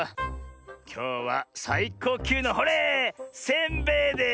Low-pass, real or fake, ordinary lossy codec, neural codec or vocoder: none; real; none; none